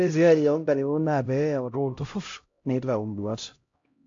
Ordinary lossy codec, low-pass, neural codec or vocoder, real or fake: AAC, 48 kbps; 7.2 kHz; codec, 16 kHz, 0.5 kbps, X-Codec, HuBERT features, trained on LibriSpeech; fake